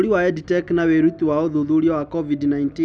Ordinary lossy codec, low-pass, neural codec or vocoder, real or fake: none; none; none; real